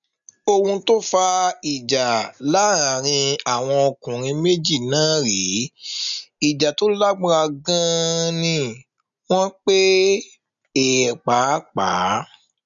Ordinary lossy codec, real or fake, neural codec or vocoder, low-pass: none; real; none; 7.2 kHz